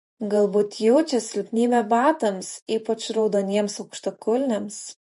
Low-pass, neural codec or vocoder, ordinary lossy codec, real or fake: 14.4 kHz; vocoder, 48 kHz, 128 mel bands, Vocos; MP3, 48 kbps; fake